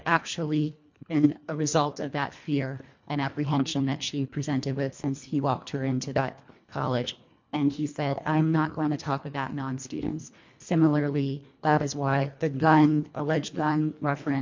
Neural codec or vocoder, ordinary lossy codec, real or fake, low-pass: codec, 24 kHz, 1.5 kbps, HILCodec; MP3, 48 kbps; fake; 7.2 kHz